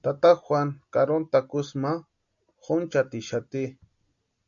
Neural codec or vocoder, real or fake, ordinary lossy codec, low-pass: none; real; MP3, 64 kbps; 7.2 kHz